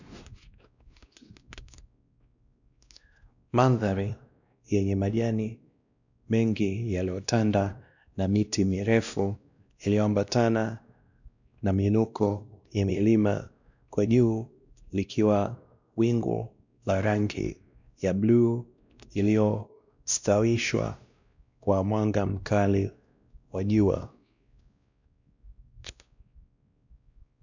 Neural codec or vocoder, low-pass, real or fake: codec, 16 kHz, 1 kbps, X-Codec, WavLM features, trained on Multilingual LibriSpeech; 7.2 kHz; fake